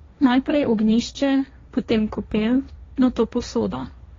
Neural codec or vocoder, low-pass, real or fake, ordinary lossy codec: codec, 16 kHz, 1.1 kbps, Voila-Tokenizer; 7.2 kHz; fake; AAC, 32 kbps